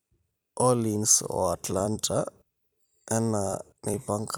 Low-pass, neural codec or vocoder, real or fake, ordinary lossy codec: none; vocoder, 44.1 kHz, 128 mel bands every 256 samples, BigVGAN v2; fake; none